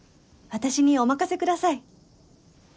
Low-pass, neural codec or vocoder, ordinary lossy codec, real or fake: none; none; none; real